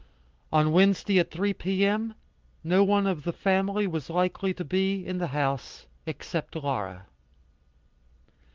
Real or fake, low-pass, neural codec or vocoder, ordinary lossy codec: real; 7.2 kHz; none; Opus, 32 kbps